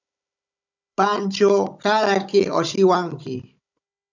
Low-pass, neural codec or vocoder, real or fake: 7.2 kHz; codec, 16 kHz, 16 kbps, FunCodec, trained on Chinese and English, 50 frames a second; fake